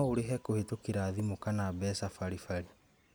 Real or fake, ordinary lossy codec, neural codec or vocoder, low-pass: real; none; none; none